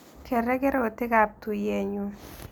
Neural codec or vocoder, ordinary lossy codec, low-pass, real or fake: none; none; none; real